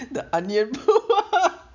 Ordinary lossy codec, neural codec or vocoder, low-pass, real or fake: none; none; 7.2 kHz; real